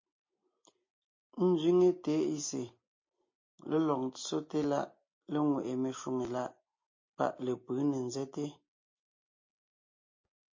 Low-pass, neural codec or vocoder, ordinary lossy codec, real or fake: 7.2 kHz; none; MP3, 32 kbps; real